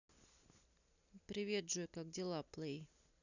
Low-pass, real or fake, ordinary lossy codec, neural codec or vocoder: 7.2 kHz; real; none; none